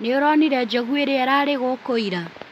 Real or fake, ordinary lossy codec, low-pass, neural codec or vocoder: real; MP3, 96 kbps; 14.4 kHz; none